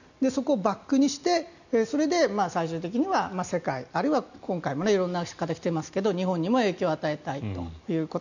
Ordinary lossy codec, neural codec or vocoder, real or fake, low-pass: none; none; real; 7.2 kHz